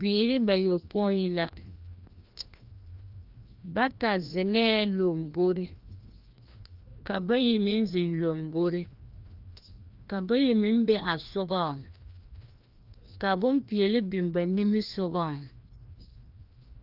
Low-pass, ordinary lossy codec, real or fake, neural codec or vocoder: 5.4 kHz; Opus, 24 kbps; fake; codec, 16 kHz, 1 kbps, FreqCodec, larger model